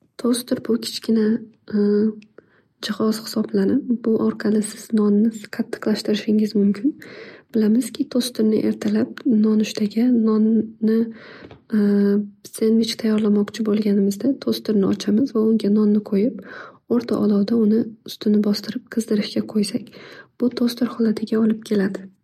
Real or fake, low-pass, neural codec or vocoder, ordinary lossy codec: real; 19.8 kHz; none; MP3, 64 kbps